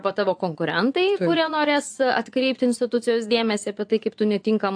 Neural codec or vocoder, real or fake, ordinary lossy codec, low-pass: vocoder, 24 kHz, 100 mel bands, Vocos; fake; AAC, 64 kbps; 9.9 kHz